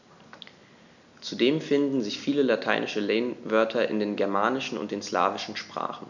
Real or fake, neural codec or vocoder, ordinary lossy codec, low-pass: real; none; none; 7.2 kHz